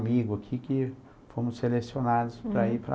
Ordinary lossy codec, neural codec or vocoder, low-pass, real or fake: none; none; none; real